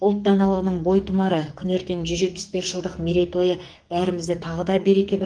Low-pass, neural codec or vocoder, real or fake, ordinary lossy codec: 9.9 kHz; codec, 44.1 kHz, 2.6 kbps, SNAC; fake; Opus, 24 kbps